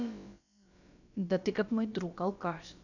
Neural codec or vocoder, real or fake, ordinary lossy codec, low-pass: codec, 16 kHz, about 1 kbps, DyCAST, with the encoder's durations; fake; none; 7.2 kHz